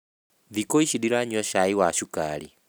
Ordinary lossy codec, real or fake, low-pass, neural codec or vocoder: none; real; none; none